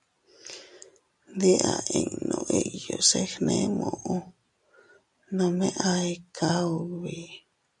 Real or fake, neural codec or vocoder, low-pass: real; none; 10.8 kHz